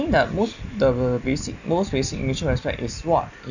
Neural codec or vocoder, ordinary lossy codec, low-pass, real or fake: none; none; 7.2 kHz; real